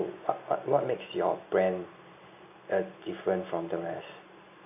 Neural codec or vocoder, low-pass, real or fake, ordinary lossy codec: none; 3.6 kHz; real; none